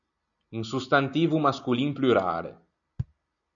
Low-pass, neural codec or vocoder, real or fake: 7.2 kHz; none; real